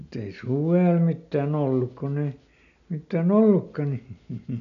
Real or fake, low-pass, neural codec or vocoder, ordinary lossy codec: real; 7.2 kHz; none; none